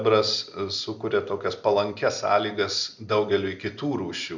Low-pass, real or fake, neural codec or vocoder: 7.2 kHz; real; none